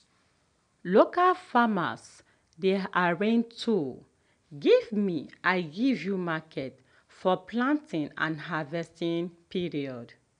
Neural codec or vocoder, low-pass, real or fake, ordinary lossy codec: none; 9.9 kHz; real; none